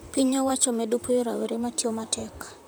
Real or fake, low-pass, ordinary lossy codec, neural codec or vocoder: fake; none; none; vocoder, 44.1 kHz, 128 mel bands, Pupu-Vocoder